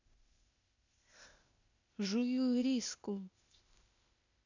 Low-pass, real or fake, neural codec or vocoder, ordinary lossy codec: 7.2 kHz; fake; codec, 16 kHz, 0.8 kbps, ZipCodec; none